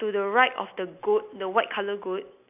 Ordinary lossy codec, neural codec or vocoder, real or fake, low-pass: AAC, 32 kbps; none; real; 3.6 kHz